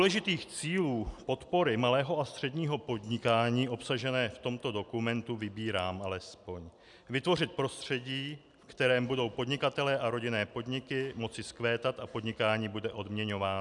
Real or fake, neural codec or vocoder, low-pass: real; none; 10.8 kHz